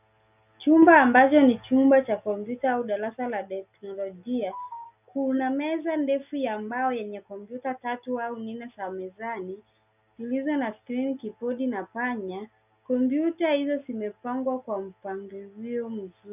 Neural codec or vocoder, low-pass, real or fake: none; 3.6 kHz; real